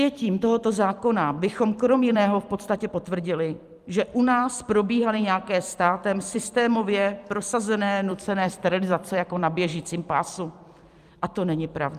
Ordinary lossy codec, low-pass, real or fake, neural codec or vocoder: Opus, 32 kbps; 14.4 kHz; fake; vocoder, 44.1 kHz, 128 mel bands every 256 samples, BigVGAN v2